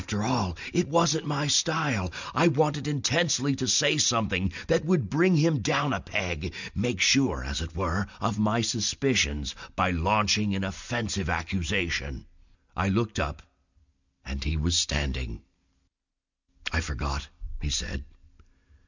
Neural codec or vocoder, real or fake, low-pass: none; real; 7.2 kHz